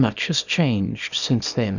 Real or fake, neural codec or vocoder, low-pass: fake; codec, 24 kHz, 0.9 kbps, WavTokenizer, small release; 7.2 kHz